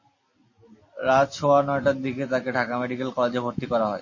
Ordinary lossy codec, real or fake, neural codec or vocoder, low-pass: MP3, 32 kbps; real; none; 7.2 kHz